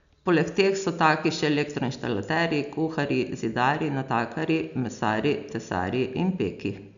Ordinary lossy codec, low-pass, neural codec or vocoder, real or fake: none; 7.2 kHz; none; real